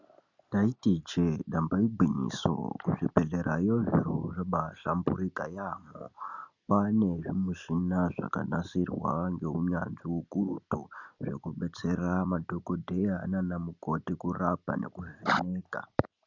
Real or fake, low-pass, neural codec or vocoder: real; 7.2 kHz; none